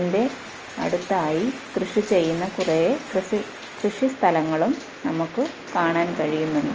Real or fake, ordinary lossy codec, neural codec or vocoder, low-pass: real; Opus, 24 kbps; none; 7.2 kHz